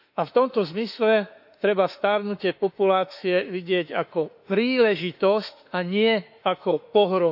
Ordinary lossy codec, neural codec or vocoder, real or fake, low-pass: none; autoencoder, 48 kHz, 32 numbers a frame, DAC-VAE, trained on Japanese speech; fake; 5.4 kHz